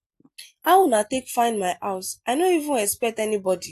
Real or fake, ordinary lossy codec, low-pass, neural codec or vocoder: real; AAC, 64 kbps; 14.4 kHz; none